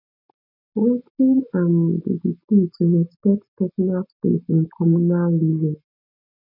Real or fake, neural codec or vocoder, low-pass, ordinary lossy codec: real; none; 5.4 kHz; none